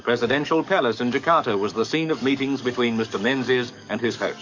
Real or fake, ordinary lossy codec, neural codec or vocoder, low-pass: fake; MP3, 48 kbps; codec, 44.1 kHz, 7.8 kbps, DAC; 7.2 kHz